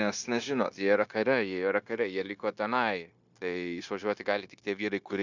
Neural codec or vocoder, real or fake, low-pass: codec, 16 kHz, 0.9 kbps, LongCat-Audio-Codec; fake; 7.2 kHz